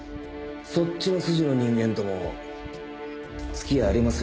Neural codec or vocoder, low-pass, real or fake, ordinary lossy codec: none; none; real; none